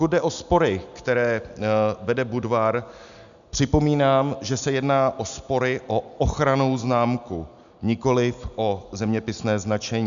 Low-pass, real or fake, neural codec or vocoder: 7.2 kHz; real; none